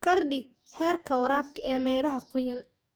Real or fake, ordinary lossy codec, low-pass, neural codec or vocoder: fake; none; none; codec, 44.1 kHz, 2.6 kbps, DAC